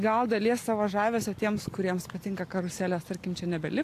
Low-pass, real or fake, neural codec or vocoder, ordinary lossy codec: 14.4 kHz; real; none; AAC, 64 kbps